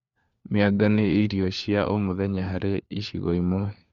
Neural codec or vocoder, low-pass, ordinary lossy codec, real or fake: codec, 16 kHz, 4 kbps, FunCodec, trained on LibriTTS, 50 frames a second; 7.2 kHz; Opus, 64 kbps; fake